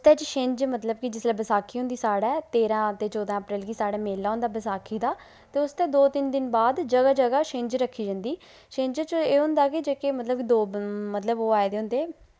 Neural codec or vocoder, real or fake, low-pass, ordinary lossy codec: none; real; none; none